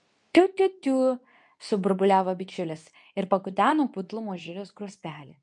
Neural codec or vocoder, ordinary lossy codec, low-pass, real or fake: codec, 24 kHz, 0.9 kbps, WavTokenizer, medium speech release version 2; MP3, 64 kbps; 10.8 kHz; fake